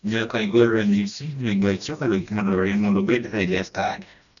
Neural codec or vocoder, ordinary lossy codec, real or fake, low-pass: codec, 16 kHz, 1 kbps, FreqCodec, smaller model; none; fake; 7.2 kHz